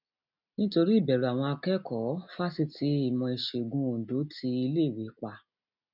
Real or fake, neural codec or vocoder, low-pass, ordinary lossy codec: real; none; 5.4 kHz; none